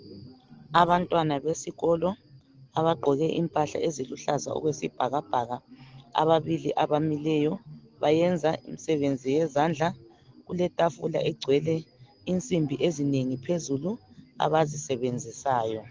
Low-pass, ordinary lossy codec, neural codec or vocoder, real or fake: 7.2 kHz; Opus, 16 kbps; none; real